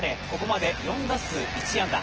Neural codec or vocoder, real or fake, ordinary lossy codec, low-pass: vocoder, 24 kHz, 100 mel bands, Vocos; fake; Opus, 16 kbps; 7.2 kHz